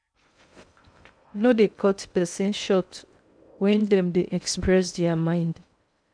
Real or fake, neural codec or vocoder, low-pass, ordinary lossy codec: fake; codec, 16 kHz in and 24 kHz out, 0.6 kbps, FocalCodec, streaming, 2048 codes; 9.9 kHz; none